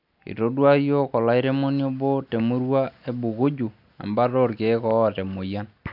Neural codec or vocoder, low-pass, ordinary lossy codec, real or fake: none; 5.4 kHz; none; real